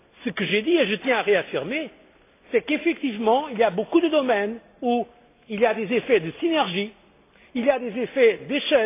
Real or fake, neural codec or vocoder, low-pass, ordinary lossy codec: real; none; 3.6 kHz; AAC, 24 kbps